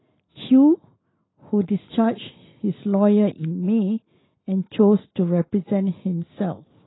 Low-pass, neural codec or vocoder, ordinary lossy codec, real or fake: 7.2 kHz; none; AAC, 16 kbps; real